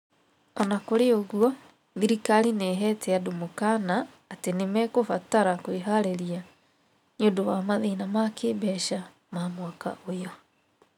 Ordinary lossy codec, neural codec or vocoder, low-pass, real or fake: none; none; 19.8 kHz; real